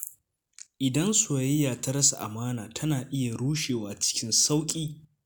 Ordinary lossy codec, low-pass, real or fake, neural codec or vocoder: none; none; real; none